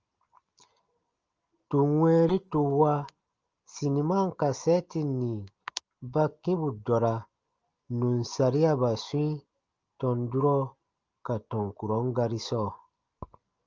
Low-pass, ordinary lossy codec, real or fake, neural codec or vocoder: 7.2 kHz; Opus, 24 kbps; real; none